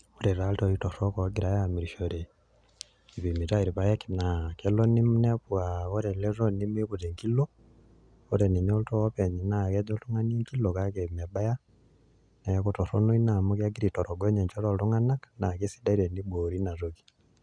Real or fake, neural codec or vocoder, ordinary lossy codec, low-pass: real; none; none; 9.9 kHz